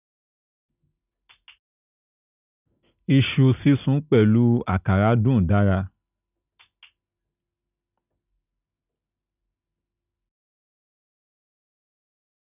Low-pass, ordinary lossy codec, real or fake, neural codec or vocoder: 3.6 kHz; none; real; none